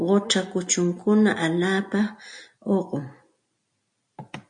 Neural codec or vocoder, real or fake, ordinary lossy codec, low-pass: none; real; MP3, 48 kbps; 9.9 kHz